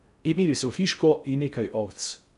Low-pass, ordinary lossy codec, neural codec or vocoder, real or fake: 10.8 kHz; none; codec, 16 kHz in and 24 kHz out, 0.6 kbps, FocalCodec, streaming, 4096 codes; fake